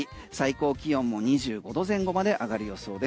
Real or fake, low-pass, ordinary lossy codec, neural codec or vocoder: real; none; none; none